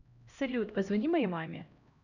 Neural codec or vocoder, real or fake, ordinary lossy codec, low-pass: codec, 16 kHz, 1 kbps, X-Codec, HuBERT features, trained on LibriSpeech; fake; none; 7.2 kHz